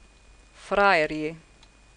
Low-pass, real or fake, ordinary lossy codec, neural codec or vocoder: 9.9 kHz; real; none; none